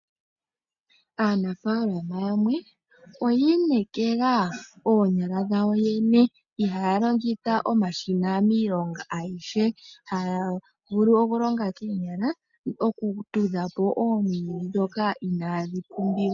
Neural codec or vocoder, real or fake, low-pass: none; real; 7.2 kHz